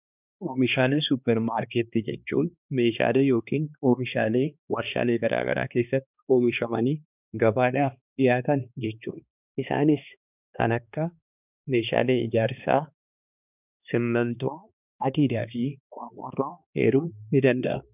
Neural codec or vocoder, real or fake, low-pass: codec, 16 kHz, 2 kbps, X-Codec, HuBERT features, trained on balanced general audio; fake; 3.6 kHz